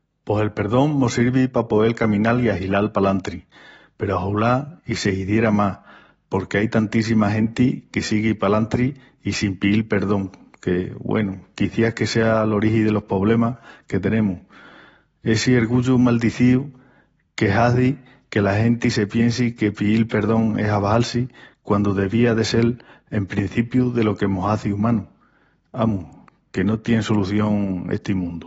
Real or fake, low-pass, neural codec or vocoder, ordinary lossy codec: real; 10.8 kHz; none; AAC, 24 kbps